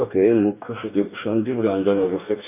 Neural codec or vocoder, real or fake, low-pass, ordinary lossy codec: codec, 44.1 kHz, 2.6 kbps, DAC; fake; 3.6 kHz; none